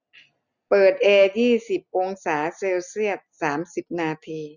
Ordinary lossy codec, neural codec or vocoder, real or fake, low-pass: none; none; real; 7.2 kHz